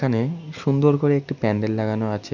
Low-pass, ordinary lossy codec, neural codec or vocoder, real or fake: 7.2 kHz; none; none; real